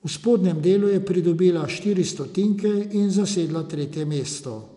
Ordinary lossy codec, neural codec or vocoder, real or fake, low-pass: none; none; real; 10.8 kHz